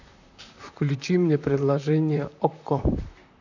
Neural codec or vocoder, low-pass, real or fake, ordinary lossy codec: vocoder, 44.1 kHz, 128 mel bands, Pupu-Vocoder; 7.2 kHz; fake; none